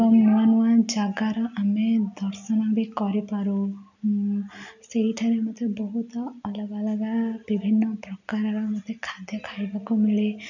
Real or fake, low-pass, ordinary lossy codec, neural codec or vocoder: real; 7.2 kHz; none; none